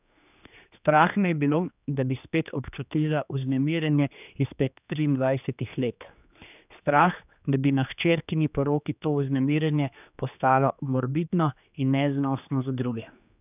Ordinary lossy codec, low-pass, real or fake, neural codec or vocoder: none; 3.6 kHz; fake; codec, 16 kHz, 2 kbps, X-Codec, HuBERT features, trained on general audio